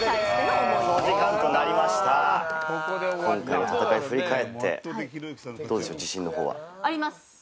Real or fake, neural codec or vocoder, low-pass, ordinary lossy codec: real; none; none; none